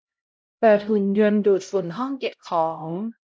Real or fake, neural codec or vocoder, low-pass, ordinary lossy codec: fake; codec, 16 kHz, 0.5 kbps, X-Codec, HuBERT features, trained on LibriSpeech; none; none